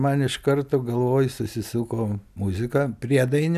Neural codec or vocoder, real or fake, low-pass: none; real; 14.4 kHz